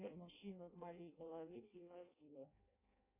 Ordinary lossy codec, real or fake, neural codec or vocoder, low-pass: AAC, 32 kbps; fake; codec, 16 kHz in and 24 kHz out, 0.6 kbps, FireRedTTS-2 codec; 3.6 kHz